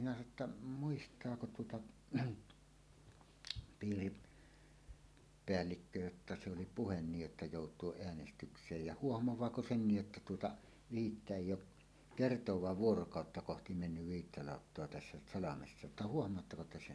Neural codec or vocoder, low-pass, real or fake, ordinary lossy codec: none; none; real; none